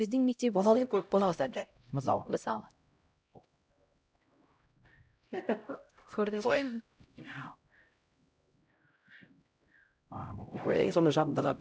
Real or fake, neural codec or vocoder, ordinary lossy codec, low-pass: fake; codec, 16 kHz, 0.5 kbps, X-Codec, HuBERT features, trained on LibriSpeech; none; none